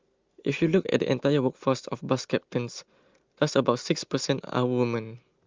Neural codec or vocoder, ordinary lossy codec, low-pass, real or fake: none; Opus, 32 kbps; 7.2 kHz; real